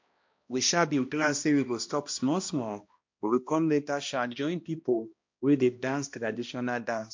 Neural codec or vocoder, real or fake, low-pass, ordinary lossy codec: codec, 16 kHz, 1 kbps, X-Codec, HuBERT features, trained on balanced general audio; fake; 7.2 kHz; MP3, 48 kbps